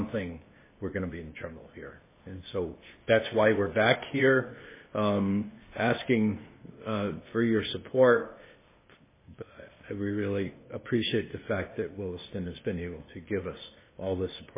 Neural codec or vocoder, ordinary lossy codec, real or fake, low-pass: codec, 16 kHz, 0.8 kbps, ZipCodec; MP3, 16 kbps; fake; 3.6 kHz